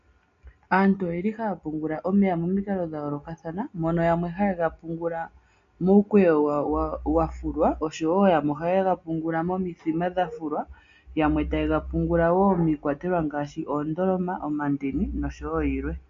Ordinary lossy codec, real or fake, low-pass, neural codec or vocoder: MP3, 48 kbps; real; 7.2 kHz; none